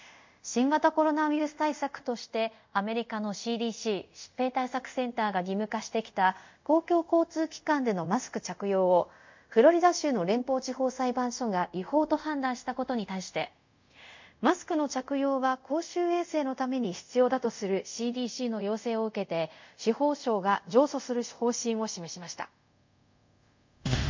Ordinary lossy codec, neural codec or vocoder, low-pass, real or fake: MP3, 64 kbps; codec, 24 kHz, 0.5 kbps, DualCodec; 7.2 kHz; fake